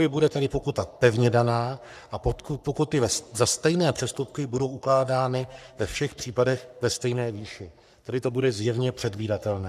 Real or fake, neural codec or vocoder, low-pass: fake; codec, 44.1 kHz, 3.4 kbps, Pupu-Codec; 14.4 kHz